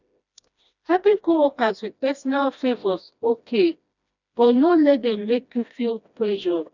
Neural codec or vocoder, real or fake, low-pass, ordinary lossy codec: codec, 16 kHz, 1 kbps, FreqCodec, smaller model; fake; 7.2 kHz; none